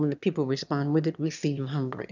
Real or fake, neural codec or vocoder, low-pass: fake; autoencoder, 22.05 kHz, a latent of 192 numbers a frame, VITS, trained on one speaker; 7.2 kHz